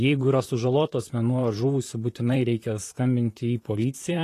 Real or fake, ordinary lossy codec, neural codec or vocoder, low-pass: fake; AAC, 48 kbps; vocoder, 44.1 kHz, 128 mel bands, Pupu-Vocoder; 14.4 kHz